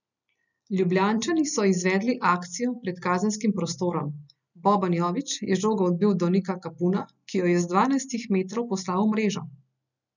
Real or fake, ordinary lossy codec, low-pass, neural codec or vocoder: real; none; 7.2 kHz; none